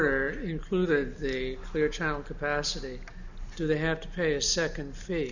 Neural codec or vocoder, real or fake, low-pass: none; real; 7.2 kHz